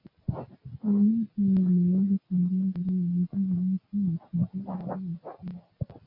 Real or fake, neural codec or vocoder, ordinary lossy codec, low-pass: real; none; MP3, 32 kbps; 5.4 kHz